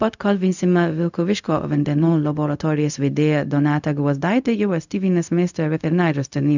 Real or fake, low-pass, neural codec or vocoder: fake; 7.2 kHz; codec, 16 kHz, 0.4 kbps, LongCat-Audio-Codec